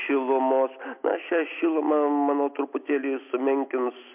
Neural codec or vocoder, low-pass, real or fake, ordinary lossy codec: none; 3.6 kHz; real; MP3, 32 kbps